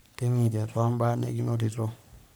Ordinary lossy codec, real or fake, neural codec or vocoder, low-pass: none; fake; codec, 44.1 kHz, 3.4 kbps, Pupu-Codec; none